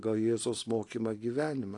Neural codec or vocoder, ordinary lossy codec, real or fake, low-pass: none; AAC, 48 kbps; real; 10.8 kHz